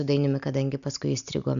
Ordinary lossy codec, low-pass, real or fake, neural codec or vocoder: MP3, 96 kbps; 7.2 kHz; real; none